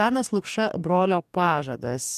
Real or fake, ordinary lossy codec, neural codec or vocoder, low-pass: fake; MP3, 96 kbps; codec, 44.1 kHz, 2.6 kbps, DAC; 14.4 kHz